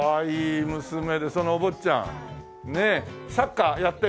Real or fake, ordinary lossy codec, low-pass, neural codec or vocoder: real; none; none; none